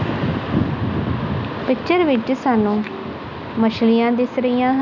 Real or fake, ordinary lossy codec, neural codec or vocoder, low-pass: real; none; none; 7.2 kHz